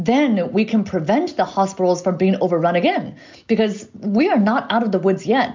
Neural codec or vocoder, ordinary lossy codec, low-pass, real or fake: none; MP3, 64 kbps; 7.2 kHz; real